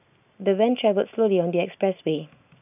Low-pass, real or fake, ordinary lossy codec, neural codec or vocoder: 3.6 kHz; real; none; none